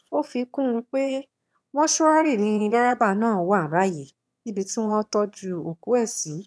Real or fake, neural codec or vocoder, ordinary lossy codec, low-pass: fake; autoencoder, 22.05 kHz, a latent of 192 numbers a frame, VITS, trained on one speaker; none; none